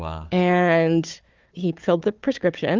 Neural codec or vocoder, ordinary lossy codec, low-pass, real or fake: codec, 16 kHz, 4 kbps, FunCodec, trained on Chinese and English, 50 frames a second; Opus, 32 kbps; 7.2 kHz; fake